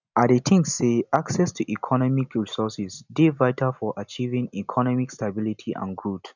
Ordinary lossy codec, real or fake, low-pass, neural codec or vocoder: none; real; 7.2 kHz; none